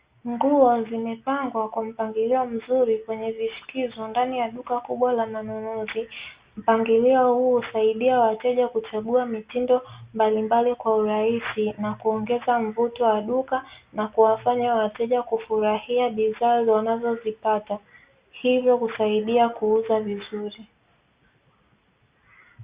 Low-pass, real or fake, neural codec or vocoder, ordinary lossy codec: 3.6 kHz; real; none; Opus, 64 kbps